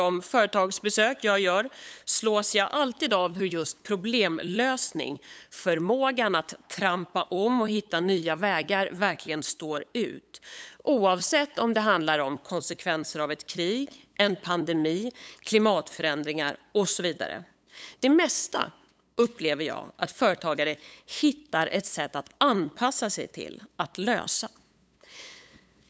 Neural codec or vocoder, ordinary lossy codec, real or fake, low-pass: codec, 16 kHz, 8 kbps, FunCodec, trained on LibriTTS, 25 frames a second; none; fake; none